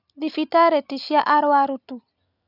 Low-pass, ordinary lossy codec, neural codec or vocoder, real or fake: 5.4 kHz; none; none; real